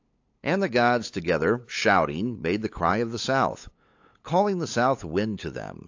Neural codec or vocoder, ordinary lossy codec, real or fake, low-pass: codec, 16 kHz, 8 kbps, FunCodec, trained on LibriTTS, 25 frames a second; AAC, 48 kbps; fake; 7.2 kHz